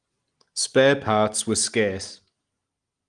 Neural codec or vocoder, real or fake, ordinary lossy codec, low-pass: none; real; Opus, 24 kbps; 9.9 kHz